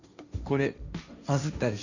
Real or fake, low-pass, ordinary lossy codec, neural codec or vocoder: fake; 7.2 kHz; none; codec, 16 kHz, 1.1 kbps, Voila-Tokenizer